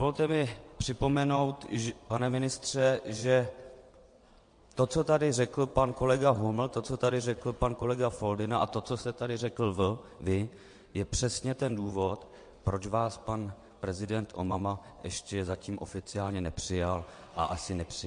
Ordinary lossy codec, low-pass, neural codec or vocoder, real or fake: MP3, 48 kbps; 9.9 kHz; vocoder, 22.05 kHz, 80 mel bands, WaveNeXt; fake